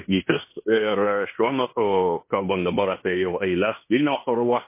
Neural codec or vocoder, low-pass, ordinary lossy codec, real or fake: codec, 16 kHz in and 24 kHz out, 0.9 kbps, LongCat-Audio-Codec, fine tuned four codebook decoder; 3.6 kHz; MP3, 24 kbps; fake